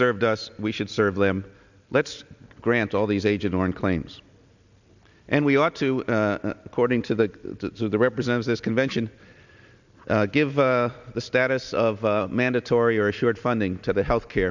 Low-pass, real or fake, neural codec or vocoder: 7.2 kHz; real; none